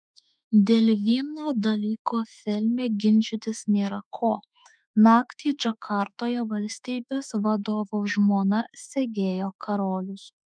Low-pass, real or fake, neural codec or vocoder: 9.9 kHz; fake; autoencoder, 48 kHz, 32 numbers a frame, DAC-VAE, trained on Japanese speech